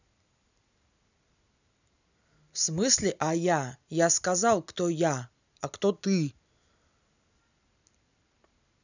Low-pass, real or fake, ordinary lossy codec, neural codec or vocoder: 7.2 kHz; real; none; none